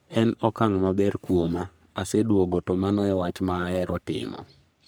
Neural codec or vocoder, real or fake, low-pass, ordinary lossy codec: codec, 44.1 kHz, 3.4 kbps, Pupu-Codec; fake; none; none